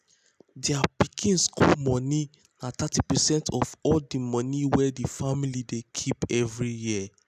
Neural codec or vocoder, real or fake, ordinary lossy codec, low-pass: none; real; none; 14.4 kHz